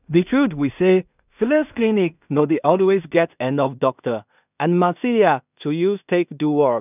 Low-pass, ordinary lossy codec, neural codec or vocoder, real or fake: 3.6 kHz; none; codec, 16 kHz in and 24 kHz out, 0.4 kbps, LongCat-Audio-Codec, two codebook decoder; fake